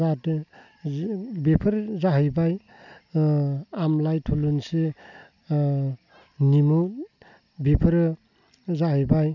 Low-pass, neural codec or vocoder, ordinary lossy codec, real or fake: 7.2 kHz; none; none; real